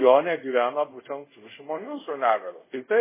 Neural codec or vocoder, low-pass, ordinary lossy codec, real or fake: codec, 24 kHz, 0.5 kbps, DualCodec; 3.6 kHz; MP3, 16 kbps; fake